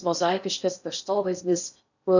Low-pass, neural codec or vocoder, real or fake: 7.2 kHz; codec, 16 kHz in and 24 kHz out, 0.6 kbps, FocalCodec, streaming, 4096 codes; fake